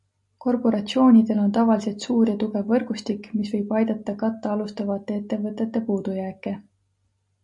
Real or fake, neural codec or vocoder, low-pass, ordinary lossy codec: real; none; 10.8 kHz; MP3, 48 kbps